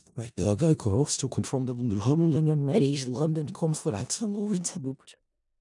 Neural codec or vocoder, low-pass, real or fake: codec, 16 kHz in and 24 kHz out, 0.4 kbps, LongCat-Audio-Codec, four codebook decoder; 10.8 kHz; fake